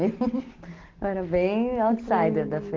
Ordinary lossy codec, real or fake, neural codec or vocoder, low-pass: Opus, 16 kbps; real; none; 7.2 kHz